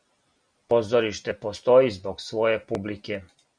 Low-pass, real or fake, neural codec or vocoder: 9.9 kHz; real; none